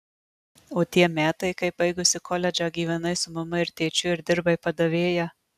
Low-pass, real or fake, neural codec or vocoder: 14.4 kHz; real; none